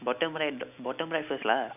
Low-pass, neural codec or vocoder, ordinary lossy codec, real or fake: 3.6 kHz; none; none; real